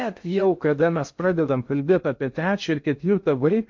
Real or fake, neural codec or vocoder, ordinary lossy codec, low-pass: fake; codec, 16 kHz in and 24 kHz out, 0.6 kbps, FocalCodec, streaming, 2048 codes; MP3, 48 kbps; 7.2 kHz